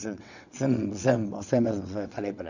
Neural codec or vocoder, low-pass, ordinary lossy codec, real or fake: vocoder, 22.05 kHz, 80 mel bands, WaveNeXt; 7.2 kHz; none; fake